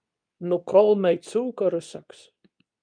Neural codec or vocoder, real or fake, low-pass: codec, 24 kHz, 0.9 kbps, WavTokenizer, medium speech release version 2; fake; 9.9 kHz